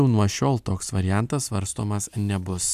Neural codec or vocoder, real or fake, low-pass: none; real; 14.4 kHz